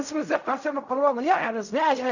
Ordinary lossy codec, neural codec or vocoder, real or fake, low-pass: none; codec, 16 kHz in and 24 kHz out, 0.4 kbps, LongCat-Audio-Codec, fine tuned four codebook decoder; fake; 7.2 kHz